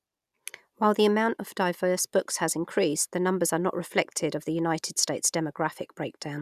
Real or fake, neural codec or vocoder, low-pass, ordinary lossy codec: real; none; 14.4 kHz; none